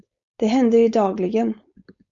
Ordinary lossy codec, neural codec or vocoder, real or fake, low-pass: Opus, 64 kbps; codec, 16 kHz, 4.8 kbps, FACodec; fake; 7.2 kHz